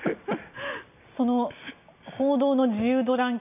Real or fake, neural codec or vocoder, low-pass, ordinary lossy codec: real; none; 3.6 kHz; none